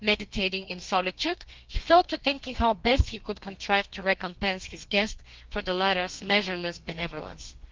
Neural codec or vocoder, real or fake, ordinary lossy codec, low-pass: codec, 32 kHz, 1.9 kbps, SNAC; fake; Opus, 32 kbps; 7.2 kHz